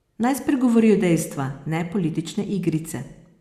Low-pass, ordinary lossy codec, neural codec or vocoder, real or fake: 14.4 kHz; Opus, 64 kbps; none; real